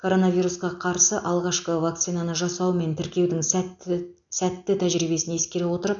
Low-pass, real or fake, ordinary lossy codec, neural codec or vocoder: 7.2 kHz; real; none; none